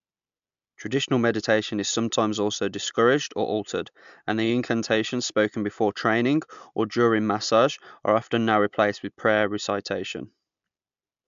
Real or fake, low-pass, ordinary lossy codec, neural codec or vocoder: real; 7.2 kHz; MP3, 64 kbps; none